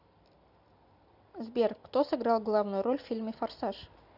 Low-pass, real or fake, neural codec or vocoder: 5.4 kHz; real; none